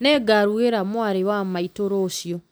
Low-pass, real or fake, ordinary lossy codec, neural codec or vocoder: none; real; none; none